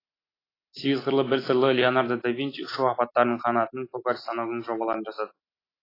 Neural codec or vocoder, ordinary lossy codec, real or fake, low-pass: none; AAC, 24 kbps; real; 5.4 kHz